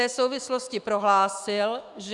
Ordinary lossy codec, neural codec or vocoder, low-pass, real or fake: Opus, 64 kbps; autoencoder, 48 kHz, 128 numbers a frame, DAC-VAE, trained on Japanese speech; 10.8 kHz; fake